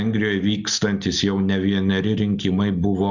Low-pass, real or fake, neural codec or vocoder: 7.2 kHz; real; none